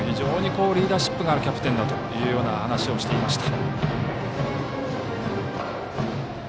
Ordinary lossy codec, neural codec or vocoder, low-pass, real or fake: none; none; none; real